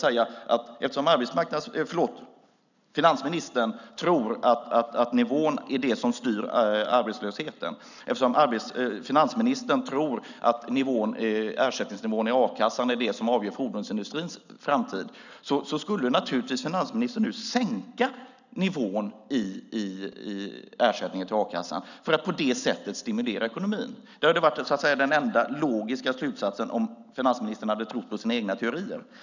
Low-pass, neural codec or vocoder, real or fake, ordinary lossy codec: 7.2 kHz; none; real; none